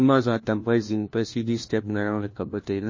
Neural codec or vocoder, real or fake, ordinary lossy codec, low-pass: codec, 16 kHz, 1 kbps, FunCodec, trained on LibriTTS, 50 frames a second; fake; MP3, 32 kbps; 7.2 kHz